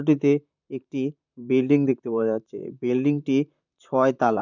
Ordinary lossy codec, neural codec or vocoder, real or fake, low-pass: none; vocoder, 44.1 kHz, 128 mel bands, Pupu-Vocoder; fake; 7.2 kHz